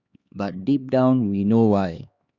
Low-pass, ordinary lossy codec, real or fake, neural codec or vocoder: 7.2 kHz; Opus, 64 kbps; fake; codec, 16 kHz, 2 kbps, X-Codec, HuBERT features, trained on LibriSpeech